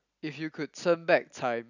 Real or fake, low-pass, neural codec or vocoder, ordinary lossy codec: real; 7.2 kHz; none; none